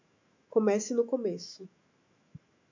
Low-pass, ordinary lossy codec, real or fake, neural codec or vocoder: 7.2 kHz; MP3, 64 kbps; fake; autoencoder, 48 kHz, 128 numbers a frame, DAC-VAE, trained on Japanese speech